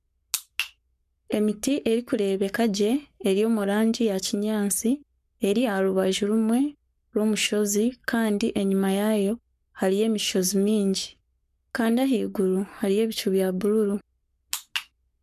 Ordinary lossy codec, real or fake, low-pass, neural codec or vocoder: none; fake; 14.4 kHz; codec, 44.1 kHz, 7.8 kbps, Pupu-Codec